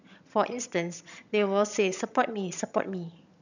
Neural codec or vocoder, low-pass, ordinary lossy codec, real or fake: vocoder, 22.05 kHz, 80 mel bands, HiFi-GAN; 7.2 kHz; none; fake